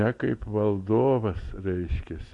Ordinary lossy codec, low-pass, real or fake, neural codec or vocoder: MP3, 48 kbps; 10.8 kHz; real; none